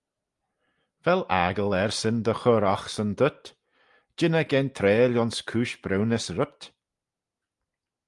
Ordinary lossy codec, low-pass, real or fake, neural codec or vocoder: Opus, 32 kbps; 10.8 kHz; real; none